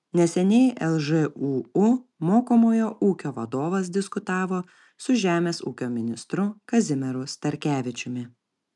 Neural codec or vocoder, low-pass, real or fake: none; 10.8 kHz; real